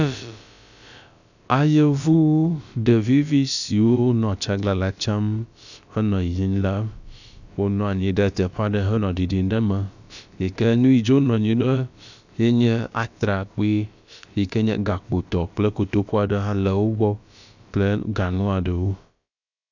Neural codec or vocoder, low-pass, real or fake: codec, 16 kHz, about 1 kbps, DyCAST, with the encoder's durations; 7.2 kHz; fake